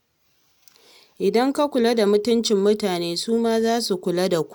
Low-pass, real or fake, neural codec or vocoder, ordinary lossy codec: 19.8 kHz; real; none; none